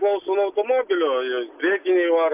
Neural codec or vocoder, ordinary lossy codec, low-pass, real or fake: none; AAC, 32 kbps; 3.6 kHz; real